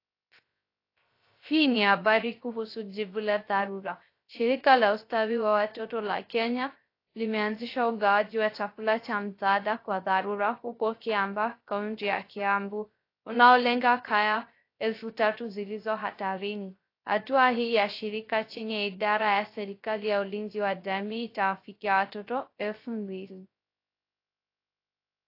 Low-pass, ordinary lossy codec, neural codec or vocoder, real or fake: 5.4 kHz; AAC, 32 kbps; codec, 16 kHz, 0.3 kbps, FocalCodec; fake